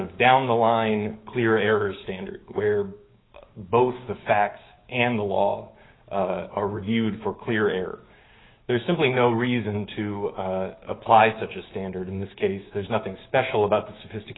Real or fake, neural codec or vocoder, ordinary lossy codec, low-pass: fake; codec, 16 kHz, 6 kbps, DAC; AAC, 16 kbps; 7.2 kHz